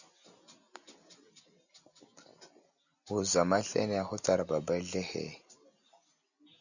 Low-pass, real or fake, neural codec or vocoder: 7.2 kHz; real; none